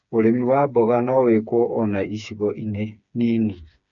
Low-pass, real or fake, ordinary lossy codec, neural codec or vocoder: 7.2 kHz; fake; none; codec, 16 kHz, 4 kbps, FreqCodec, smaller model